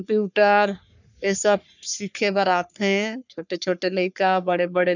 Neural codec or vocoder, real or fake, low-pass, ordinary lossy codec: codec, 44.1 kHz, 3.4 kbps, Pupu-Codec; fake; 7.2 kHz; none